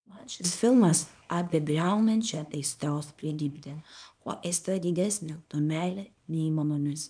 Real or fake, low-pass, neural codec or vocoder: fake; 9.9 kHz; codec, 24 kHz, 0.9 kbps, WavTokenizer, small release